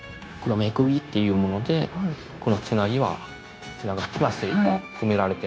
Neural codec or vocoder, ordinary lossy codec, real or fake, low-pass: codec, 16 kHz, 0.9 kbps, LongCat-Audio-Codec; none; fake; none